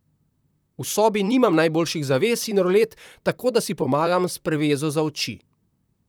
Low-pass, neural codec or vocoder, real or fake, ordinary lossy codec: none; vocoder, 44.1 kHz, 128 mel bands, Pupu-Vocoder; fake; none